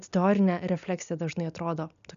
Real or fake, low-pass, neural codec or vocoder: real; 7.2 kHz; none